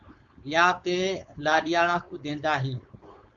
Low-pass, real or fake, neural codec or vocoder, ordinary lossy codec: 7.2 kHz; fake; codec, 16 kHz, 4.8 kbps, FACodec; Opus, 64 kbps